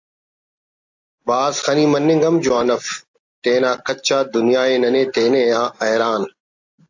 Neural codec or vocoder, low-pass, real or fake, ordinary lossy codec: none; 7.2 kHz; real; AAC, 48 kbps